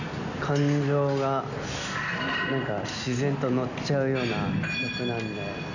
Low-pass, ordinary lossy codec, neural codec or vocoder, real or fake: 7.2 kHz; none; none; real